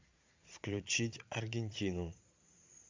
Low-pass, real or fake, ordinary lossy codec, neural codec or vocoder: 7.2 kHz; fake; MP3, 64 kbps; vocoder, 22.05 kHz, 80 mel bands, Vocos